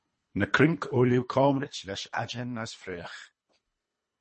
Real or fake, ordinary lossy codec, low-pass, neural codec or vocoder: fake; MP3, 32 kbps; 10.8 kHz; codec, 24 kHz, 3 kbps, HILCodec